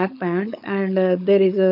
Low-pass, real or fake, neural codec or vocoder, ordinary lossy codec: 5.4 kHz; fake; codec, 16 kHz, 4.8 kbps, FACodec; AAC, 48 kbps